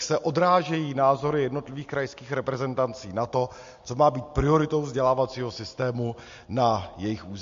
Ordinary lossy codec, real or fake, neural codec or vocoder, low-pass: MP3, 48 kbps; real; none; 7.2 kHz